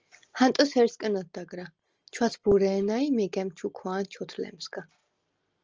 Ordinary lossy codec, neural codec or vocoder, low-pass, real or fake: Opus, 24 kbps; none; 7.2 kHz; real